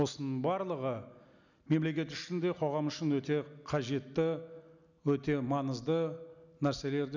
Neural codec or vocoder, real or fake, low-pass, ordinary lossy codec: none; real; 7.2 kHz; none